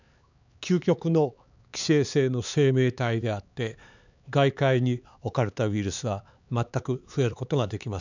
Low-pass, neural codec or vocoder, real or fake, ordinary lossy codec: 7.2 kHz; codec, 16 kHz, 4 kbps, X-Codec, HuBERT features, trained on LibriSpeech; fake; none